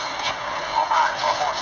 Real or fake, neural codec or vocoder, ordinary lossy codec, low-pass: fake; codec, 16 kHz, 16 kbps, FreqCodec, smaller model; none; 7.2 kHz